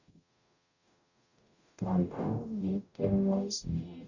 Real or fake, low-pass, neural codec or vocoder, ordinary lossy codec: fake; 7.2 kHz; codec, 44.1 kHz, 0.9 kbps, DAC; none